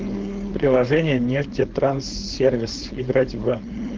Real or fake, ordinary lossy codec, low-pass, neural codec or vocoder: fake; Opus, 16 kbps; 7.2 kHz; codec, 16 kHz, 4.8 kbps, FACodec